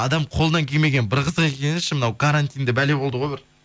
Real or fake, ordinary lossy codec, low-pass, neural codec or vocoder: real; none; none; none